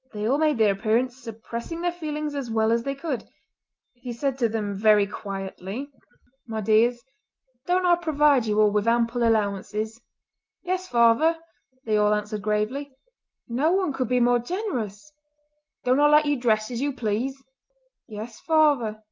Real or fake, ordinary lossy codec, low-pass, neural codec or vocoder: real; Opus, 24 kbps; 7.2 kHz; none